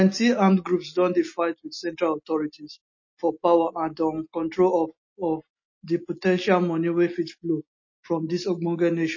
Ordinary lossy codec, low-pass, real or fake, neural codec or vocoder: MP3, 32 kbps; 7.2 kHz; real; none